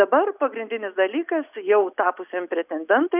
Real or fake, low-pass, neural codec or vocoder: real; 3.6 kHz; none